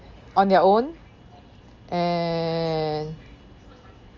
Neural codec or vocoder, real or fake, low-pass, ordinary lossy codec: none; real; 7.2 kHz; Opus, 32 kbps